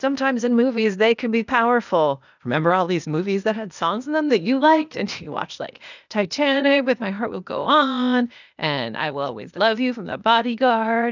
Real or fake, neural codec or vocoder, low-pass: fake; codec, 16 kHz, 0.8 kbps, ZipCodec; 7.2 kHz